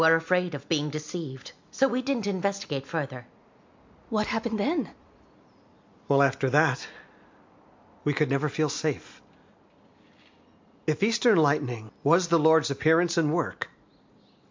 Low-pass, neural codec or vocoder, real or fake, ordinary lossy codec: 7.2 kHz; none; real; MP3, 48 kbps